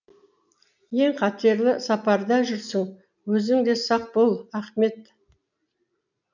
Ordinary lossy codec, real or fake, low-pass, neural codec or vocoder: none; real; 7.2 kHz; none